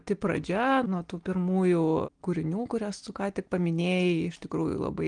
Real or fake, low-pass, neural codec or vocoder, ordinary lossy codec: real; 9.9 kHz; none; Opus, 24 kbps